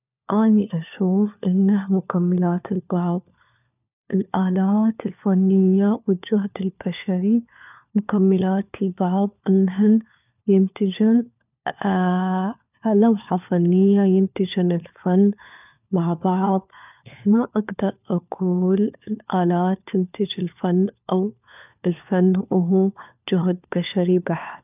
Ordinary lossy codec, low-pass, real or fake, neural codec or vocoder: none; 3.6 kHz; fake; codec, 16 kHz, 4 kbps, FunCodec, trained on LibriTTS, 50 frames a second